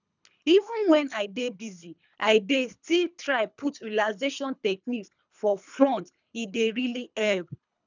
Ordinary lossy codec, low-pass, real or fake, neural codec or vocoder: none; 7.2 kHz; fake; codec, 24 kHz, 3 kbps, HILCodec